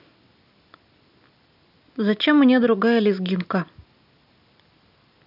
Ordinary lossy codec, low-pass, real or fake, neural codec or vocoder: none; 5.4 kHz; real; none